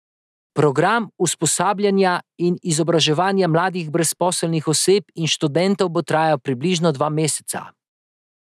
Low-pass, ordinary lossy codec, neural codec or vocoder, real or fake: none; none; none; real